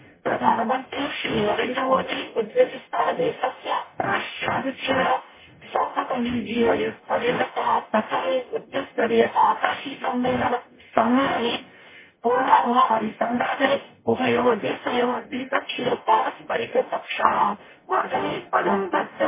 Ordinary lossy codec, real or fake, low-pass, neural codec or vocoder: MP3, 16 kbps; fake; 3.6 kHz; codec, 44.1 kHz, 0.9 kbps, DAC